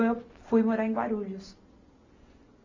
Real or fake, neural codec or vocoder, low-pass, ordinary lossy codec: real; none; 7.2 kHz; AAC, 32 kbps